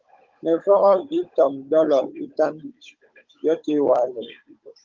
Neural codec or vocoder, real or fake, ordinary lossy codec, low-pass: codec, 16 kHz, 16 kbps, FunCodec, trained on Chinese and English, 50 frames a second; fake; Opus, 24 kbps; 7.2 kHz